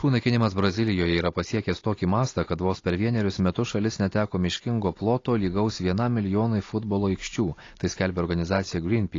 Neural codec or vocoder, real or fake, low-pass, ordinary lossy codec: none; real; 7.2 kHz; AAC, 32 kbps